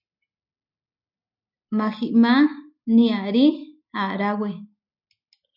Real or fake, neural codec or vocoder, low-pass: real; none; 5.4 kHz